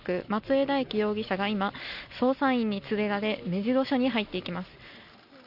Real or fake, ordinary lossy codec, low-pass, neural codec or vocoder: real; none; 5.4 kHz; none